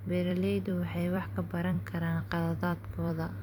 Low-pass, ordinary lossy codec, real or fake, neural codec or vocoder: 19.8 kHz; none; fake; vocoder, 44.1 kHz, 128 mel bands every 256 samples, BigVGAN v2